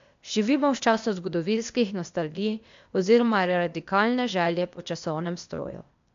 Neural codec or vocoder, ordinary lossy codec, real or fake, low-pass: codec, 16 kHz, 0.8 kbps, ZipCodec; MP3, 64 kbps; fake; 7.2 kHz